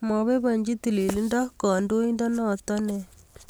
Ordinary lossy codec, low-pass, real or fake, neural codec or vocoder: none; none; real; none